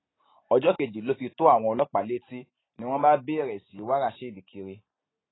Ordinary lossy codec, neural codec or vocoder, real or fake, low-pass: AAC, 16 kbps; none; real; 7.2 kHz